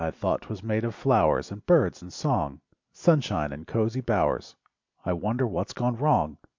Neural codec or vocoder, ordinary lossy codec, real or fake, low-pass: none; MP3, 64 kbps; real; 7.2 kHz